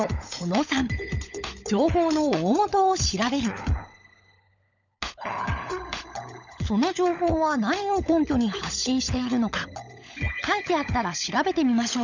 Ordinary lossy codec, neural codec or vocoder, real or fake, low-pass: none; codec, 16 kHz, 16 kbps, FunCodec, trained on LibriTTS, 50 frames a second; fake; 7.2 kHz